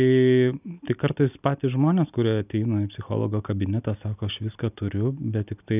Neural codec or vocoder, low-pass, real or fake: none; 3.6 kHz; real